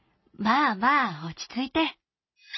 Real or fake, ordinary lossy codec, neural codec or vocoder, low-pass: real; MP3, 24 kbps; none; 7.2 kHz